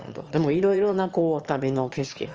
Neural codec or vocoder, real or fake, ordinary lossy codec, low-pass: autoencoder, 22.05 kHz, a latent of 192 numbers a frame, VITS, trained on one speaker; fake; Opus, 24 kbps; 7.2 kHz